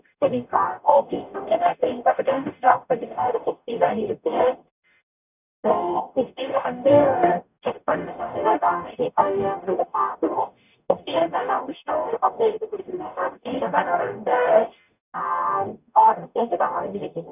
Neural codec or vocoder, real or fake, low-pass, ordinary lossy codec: codec, 44.1 kHz, 0.9 kbps, DAC; fake; 3.6 kHz; none